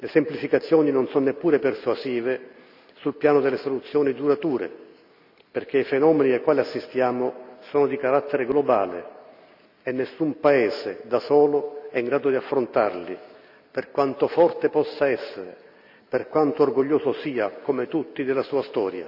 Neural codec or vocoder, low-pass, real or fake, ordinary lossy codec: none; 5.4 kHz; real; none